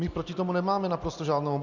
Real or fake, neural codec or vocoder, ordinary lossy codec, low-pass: real; none; Opus, 64 kbps; 7.2 kHz